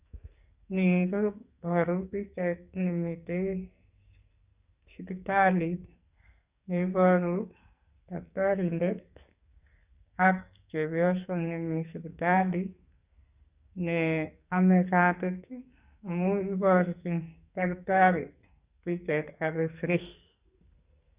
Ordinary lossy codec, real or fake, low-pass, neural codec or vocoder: Opus, 64 kbps; fake; 3.6 kHz; codec, 44.1 kHz, 2.6 kbps, SNAC